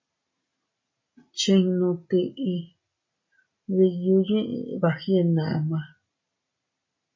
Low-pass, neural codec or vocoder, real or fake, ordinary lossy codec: 7.2 kHz; none; real; MP3, 32 kbps